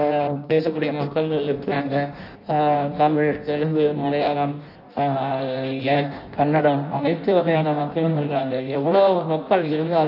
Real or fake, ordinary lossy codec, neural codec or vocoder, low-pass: fake; none; codec, 16 kHz in and 24 kHz out, 0.6 kbps, FireRedTTS-2 codec; 5.4 kHz